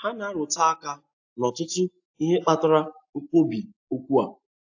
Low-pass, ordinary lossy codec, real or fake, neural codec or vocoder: 7.2 kHz; AAC, 48 kbps; real; none